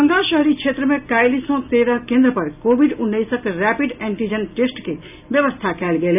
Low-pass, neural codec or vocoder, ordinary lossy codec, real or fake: 3.6 kHz; none; none; real